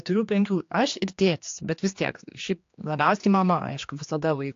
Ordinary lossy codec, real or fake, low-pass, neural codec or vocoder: AAC, 48 kbps; fake; 7.2 kHz; codec, 16 kHz, 2 kbps, X-Codec, HuBERT features, trained on general audio